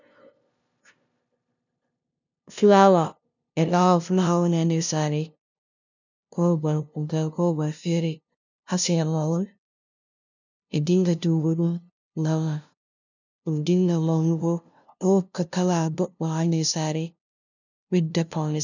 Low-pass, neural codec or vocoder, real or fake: 7.2 kHz; codec, 16 kHz, 0.5 kbps, FunCodec, trained on LibriTTS, 25 frames a second; fake